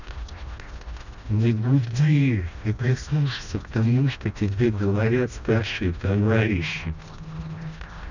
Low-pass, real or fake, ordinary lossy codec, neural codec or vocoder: 7.2 kHz; fake; none; codec, 16 kHz, 1 kbps, FreqCodec, smaller model